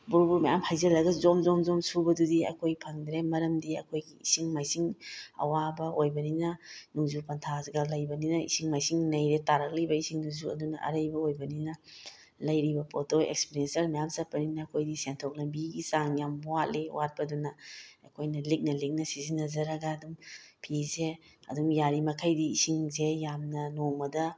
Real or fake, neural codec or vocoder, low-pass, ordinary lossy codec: real; none; none; none